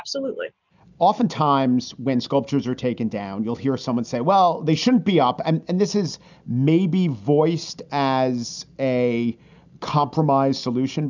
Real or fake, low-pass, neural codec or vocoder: real; 7.2 kHz; none